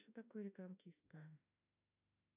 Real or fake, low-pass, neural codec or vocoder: fake; 3.6 kHz; autoencoder, 48 kHz, 32 numbers a frame, DAC-VAE, trained on Japanese speech